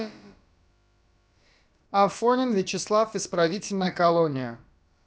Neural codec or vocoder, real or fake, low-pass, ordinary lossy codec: codec, 16 kHz, about 1 kbps, DyCAST, with the encoder's durations; fake; none; none